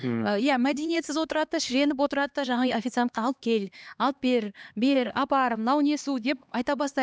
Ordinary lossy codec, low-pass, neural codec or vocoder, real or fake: none; none; codec, 16 kHz, 2 kbps, X-Codec, HuBERT features, trained on LibriSpeech; fake